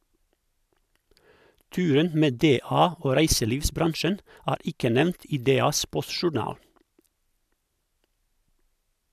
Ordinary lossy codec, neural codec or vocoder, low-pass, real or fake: none; none; 14.4 kHz; real